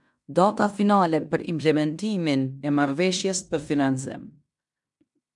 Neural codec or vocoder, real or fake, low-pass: codec, 16 kHz in and 24 kHz out, 0.9 kbps, LongCat-Audio-Codec, fine tuned four codebook decoder; fake; 10.8 kHz